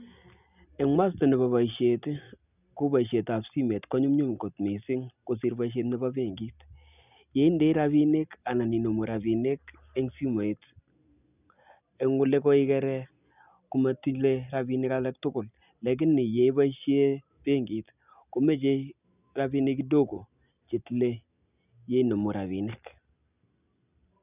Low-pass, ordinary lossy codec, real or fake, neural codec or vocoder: 3.6 kHz; none; real; none